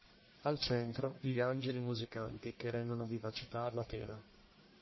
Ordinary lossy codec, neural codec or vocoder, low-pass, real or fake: MP3, 24 kbps; codec, 44.1 kHz, 1.7 kbps, Pupu-Codec; 7.2 kHz; fake